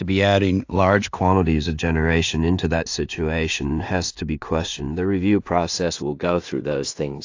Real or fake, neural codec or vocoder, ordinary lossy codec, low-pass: fake; codec, 16 kHz in and 24 kHz out, 0.4 kbps, LongCat-Audio-Codec, two codebook decoder; AAC, 48 kbps; 7.2 kHz